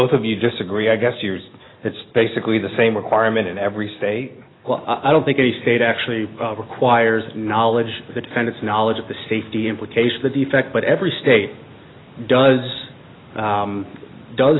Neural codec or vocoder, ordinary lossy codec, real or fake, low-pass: none; AAC, 16 kbps; real; 7.2 kHz